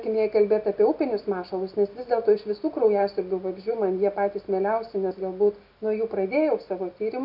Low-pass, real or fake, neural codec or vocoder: 5.4 kHz; real; none